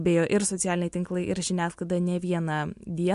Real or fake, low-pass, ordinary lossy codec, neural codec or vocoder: real; 10.8 kHz; MP3, 64 kbps; none